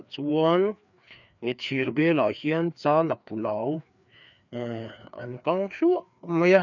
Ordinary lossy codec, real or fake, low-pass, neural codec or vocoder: none; fake; 7.2 kHz; codec, 16 kHz, 2 kbps, FreqCodec, larger model